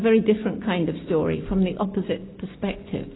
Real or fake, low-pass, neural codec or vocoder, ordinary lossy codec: real; 7.2 kHz; none; AAC, 16 kbps